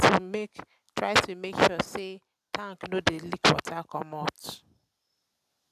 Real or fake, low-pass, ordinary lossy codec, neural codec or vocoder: real; 14.4 kHz; none; none